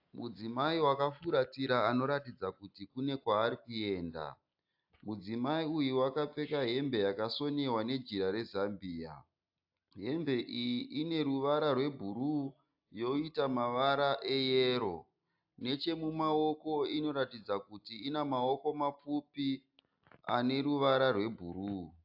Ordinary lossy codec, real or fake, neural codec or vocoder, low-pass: AAC, 48 kbps; real; none; 5.4 kHz